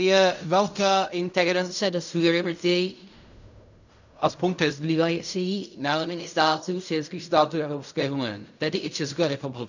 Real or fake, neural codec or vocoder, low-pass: fake; codec, 16 kHz in and 24 kHz out, 0.4 kbps, LongCat-Audio-Codec, fine tuned four codebook decoder; 7.2 kHz